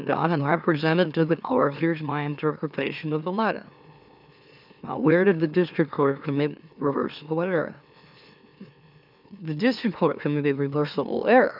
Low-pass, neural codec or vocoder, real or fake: 5.4 kHz; autoencoder, 44.1 kHz, a latent of 192 numbers a frame, MeloTTS; fake